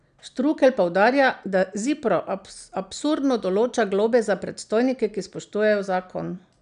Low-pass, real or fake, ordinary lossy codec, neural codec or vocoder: 9.9 kHz; real; none; none